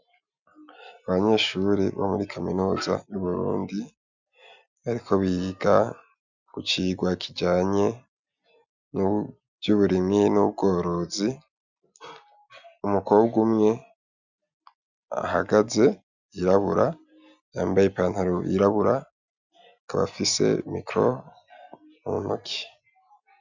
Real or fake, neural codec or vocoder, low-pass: real; none; 7.2 kHz